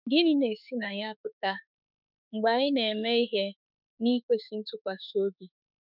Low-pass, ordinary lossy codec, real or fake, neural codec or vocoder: 5.4 kHz; none; fake; autoencoder, 48 kHz, 32 numbers a frame, DAC-VAE, trained on Japanese speech